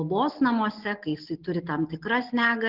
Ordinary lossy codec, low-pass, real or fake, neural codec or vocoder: Opus, 16 kbps; 5.4 kHz; real; none